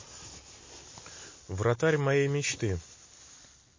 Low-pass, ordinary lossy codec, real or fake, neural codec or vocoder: 7.2 kHz; MP3, 32 kbps; real; none